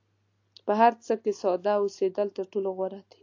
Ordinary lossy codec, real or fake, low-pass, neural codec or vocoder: AAC, 48 kbps; real; 7.2 kHz; none